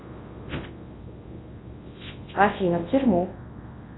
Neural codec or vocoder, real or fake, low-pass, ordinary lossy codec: codec, 24 kHz, 0.9 kbps, WavTokenizer, large speech release; fake; 7.2 kHz; AAC, 16 kbps